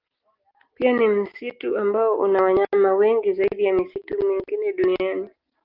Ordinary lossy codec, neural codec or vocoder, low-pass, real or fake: Opus, 32 kbps; none; 5.4 kHz; real